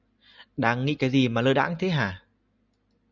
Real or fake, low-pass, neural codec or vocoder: real; 7.2 kHz; none